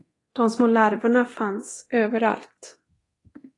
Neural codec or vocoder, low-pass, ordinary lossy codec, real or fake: codec, 24 kHz, 0.9 kbps, DualCodec; 10.8 kHz; AAC, 32 kbps; fake